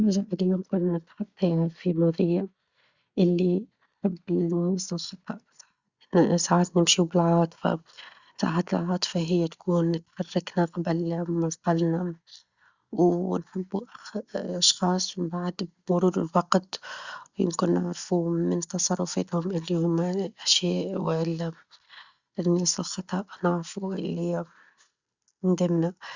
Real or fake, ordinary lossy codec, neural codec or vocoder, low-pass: real; Opus, 64 kbps; none; 7.2 kHz